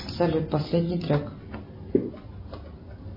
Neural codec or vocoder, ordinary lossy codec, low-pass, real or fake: none; MP3, 24 kbps; 5.4 kHz; real